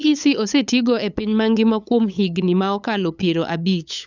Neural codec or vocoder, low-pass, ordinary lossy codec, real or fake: codec, 16 kHz, 8 kbps, FunCodec, trained on LibriTTS, 25 frames a second; 7.2 kHz; none; fake